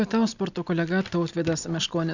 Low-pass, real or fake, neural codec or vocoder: 7.2 kHz; real; none